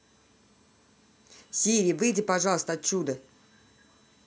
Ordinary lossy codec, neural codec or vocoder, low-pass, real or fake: none; none; none; real